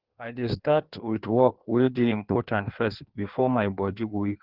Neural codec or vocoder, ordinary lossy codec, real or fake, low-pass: codec, 16 kHz in and 24 kHz out, 1.1 kbps, FireRedTTS-2 codec; Opus, 16 kbps; fake; 5.4 kHz